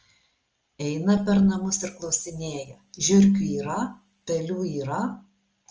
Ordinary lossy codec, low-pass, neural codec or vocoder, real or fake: Opus, 24 kbps; 7.2 kHz; none; real